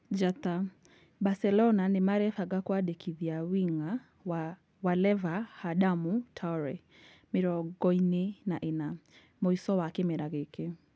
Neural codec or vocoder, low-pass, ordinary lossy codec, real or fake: none; none; none; real